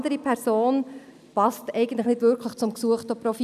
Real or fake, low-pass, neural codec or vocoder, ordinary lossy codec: real; 14.4 kHz; none; none